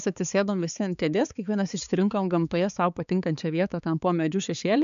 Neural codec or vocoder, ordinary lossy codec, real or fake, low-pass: codec, 16 kHz, 4 kbps, X-Codec, HuBERT features, trained on balanced general audio; MP3, 96 kbps; fake; 7.2 kHz